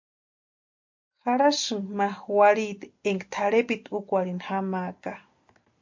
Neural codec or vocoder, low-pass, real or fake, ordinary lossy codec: none; 7.2 kHz; real; MP3, 48 kbps